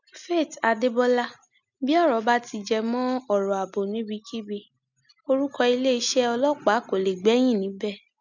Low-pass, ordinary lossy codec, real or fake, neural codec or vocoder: 7.2 kHz; none; real; none